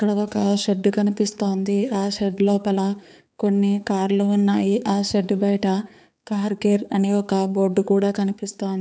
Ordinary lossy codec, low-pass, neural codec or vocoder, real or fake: none; none; codec, 16 kHz, 4 kbps, X-Codec, HuBERT features, trained on balanced general audio; fake